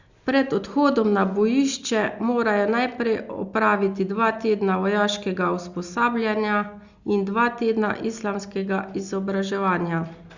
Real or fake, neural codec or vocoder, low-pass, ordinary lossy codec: real; none; 7.2 kHz; Opus, 64 kbps